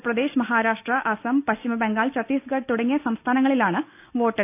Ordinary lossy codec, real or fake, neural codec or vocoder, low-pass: none; real; none; 3.6 kHz